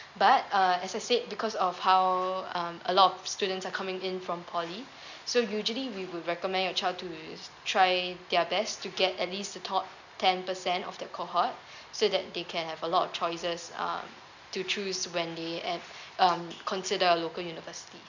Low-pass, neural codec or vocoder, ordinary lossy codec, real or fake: 7.2 kHz; none; none; real